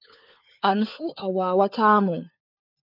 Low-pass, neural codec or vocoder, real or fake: 5.4 kHz; codec, 16 kHz, 4 kbps, FunCodec, trained on LibriTTS, 50 frames a second; fake